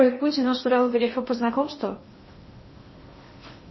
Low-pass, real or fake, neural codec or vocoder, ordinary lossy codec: 7.2 kHz; fake; codec, 16 kHz in and 24 kHz out, 0.8 kbps, FocalCodec, streaming, 65536 codes; MP3, 24 kbps